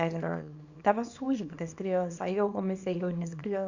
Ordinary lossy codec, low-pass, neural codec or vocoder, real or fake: none; 7.2 kHz; codec, 24 kHz, 0.9 kbps, WavTokenizer, small release; fake